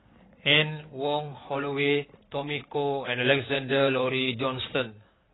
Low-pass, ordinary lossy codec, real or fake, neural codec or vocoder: 7.2 kHz; AAC, 16 kbps; fake; codec, 16 kHz in and 24 kHz out, 2.2 kbps, FireRedTTS-2 codec